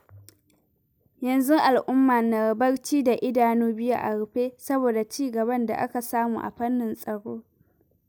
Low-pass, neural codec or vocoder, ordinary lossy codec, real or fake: none; none; none; real